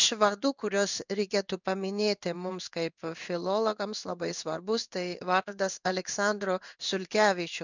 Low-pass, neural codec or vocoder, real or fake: 7.2 kHz; codec, 16 kHz in and 24 kHz out, 1 kbps, XY-Tokenizer; fake